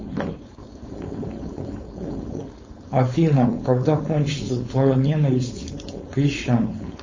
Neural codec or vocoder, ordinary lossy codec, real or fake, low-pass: codec, 16 kHz, 4.8 kbps, FACodec; MP3, 32 kbps; fake; 7.2 kHz